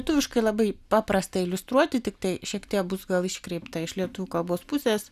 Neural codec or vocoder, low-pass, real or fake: none; 14.4 kHz; real